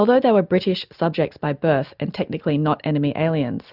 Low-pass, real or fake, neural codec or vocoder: 5.4 kHz; real; none